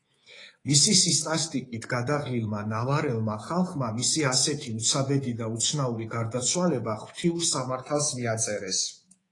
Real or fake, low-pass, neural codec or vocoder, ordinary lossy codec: fake; 10.8 kHz; codec, 24 kHz, 3.1 kbps, DualCodec; AAC, 32 kbps